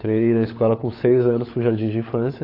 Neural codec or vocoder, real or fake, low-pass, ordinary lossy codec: codec, 16 kHz, 16 kbps, FunCodec, trained on LibriTTS, 50 frames a second; fake; 5.4 kHz; AAC, 24 kbps